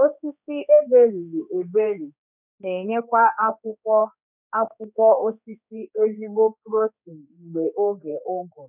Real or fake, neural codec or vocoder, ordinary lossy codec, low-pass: fake; codec, 16 kHz, 2 kbps, X-Codec, HuBERT features, trained on general audio; none; 3.6 kHz